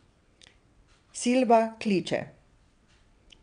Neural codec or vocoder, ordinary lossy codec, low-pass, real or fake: vocoder, 22.05 kHz, 80 mel bands, WaveNeXt; none; 9.9 kHz; fake